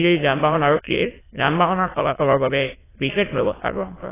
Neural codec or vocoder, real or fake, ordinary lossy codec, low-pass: autoencoder, 22.05 kHz, a latent of 192 numbers a frame, VITS, trained on many speakers; fake; AAC, 16 kbps; 3.6 kHz